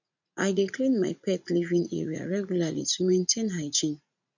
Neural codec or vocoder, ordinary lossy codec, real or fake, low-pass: vocoder, 44.1 kHz, 80 mel bands, Vocos; none; fake; 7.2 kHz